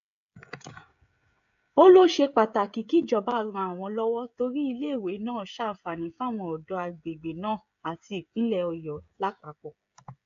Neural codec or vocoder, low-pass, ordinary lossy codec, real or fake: codec, 16 kHz, 16 kbps, FreqCodec, smaller model; 7.2 kHz; AAC, 64 kbps; fake